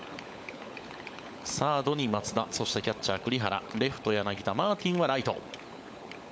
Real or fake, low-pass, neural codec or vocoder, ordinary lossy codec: fake; none; codec, 16 kHz, 16 kbps, FunCodec, trained on LibriTTS, 50 frames a second; none